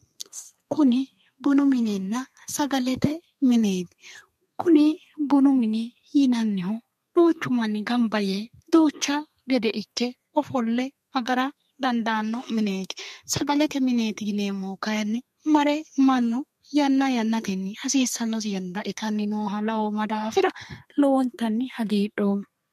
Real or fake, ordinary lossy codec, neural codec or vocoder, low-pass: fake; MP3, 64 kbps; codec, 32 kHz, 1.9 kbps, SNAC; 14.4 kHz